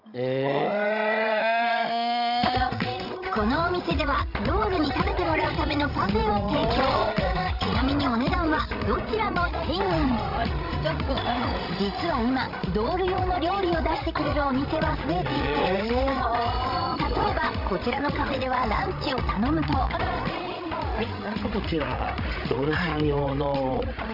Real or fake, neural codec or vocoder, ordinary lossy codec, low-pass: fake; codec, 16 kHz, 16 kbps, FreqCodec, larger model; none; 5.4 kHz